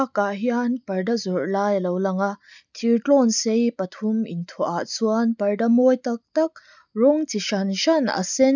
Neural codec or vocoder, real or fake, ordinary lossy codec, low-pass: none; real; none; 7.2 kHz